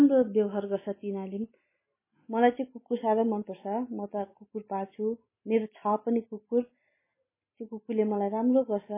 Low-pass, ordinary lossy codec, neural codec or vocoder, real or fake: 3.6 kHz; MP3, 16 kbps; none; real